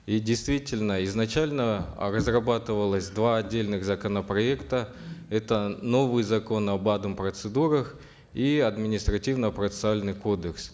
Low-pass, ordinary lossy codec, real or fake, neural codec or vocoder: none; none; real; none